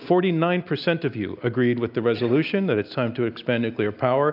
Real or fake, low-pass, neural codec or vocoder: real; 5.4 kHz; none